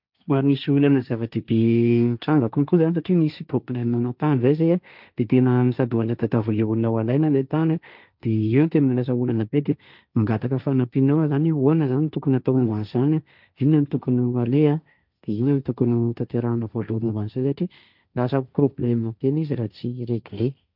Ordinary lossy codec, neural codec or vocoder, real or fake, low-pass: none; codec, 16 kHz, 1.1 kbps, Voila-Tokenizer; fake; 5.4 kHz